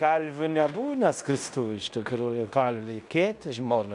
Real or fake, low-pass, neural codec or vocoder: fake; 10.8 kHz; codec, 16 kHz in and 24 kHz out, 0.9 kbps, LongCat-Audio-Codec, fine tuned four codebook decoder